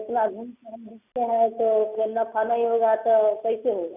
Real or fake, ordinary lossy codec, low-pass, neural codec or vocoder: real; none; 3.6 kHz; none